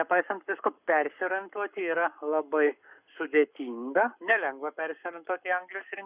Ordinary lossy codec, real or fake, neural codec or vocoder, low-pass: Opus, 64 kbps; fake; codec, 44.1 kHz, 7.8 kbps, Pupu-Codec; 3.6 kHz